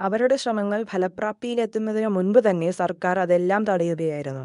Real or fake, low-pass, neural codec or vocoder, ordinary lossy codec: fake; 10.8 kHz; codec, 24 kHz, 0.9 kbps, WavTokenizer, medium speech release version 2; none